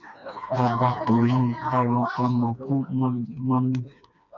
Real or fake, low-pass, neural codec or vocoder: fake; 7.2 kHz; codec, 16 kHz, 2 kbps, FreqCodec, smaller model